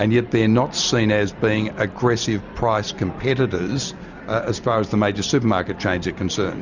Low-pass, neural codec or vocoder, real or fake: 7.2 kHz; none; real